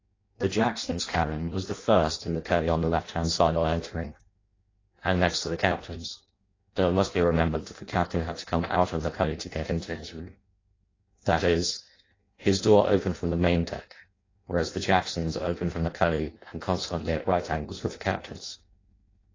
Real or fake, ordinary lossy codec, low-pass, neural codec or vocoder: fake; AAC, 32 kbps; 7.2 kHz; codec, 16 kHz in and 24 kHz out, 0.6 kbps, FireRedTTS-2 codec